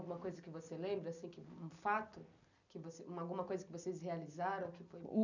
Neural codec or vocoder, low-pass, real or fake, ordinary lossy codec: none; 7.2 kHz; real; none